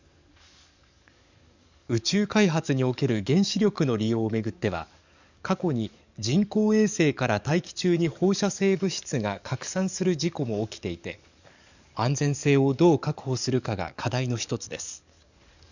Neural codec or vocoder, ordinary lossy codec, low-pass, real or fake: codec, 44.1 kHz, 7.8 kbps, DAC; none; 7.2 kHz; fake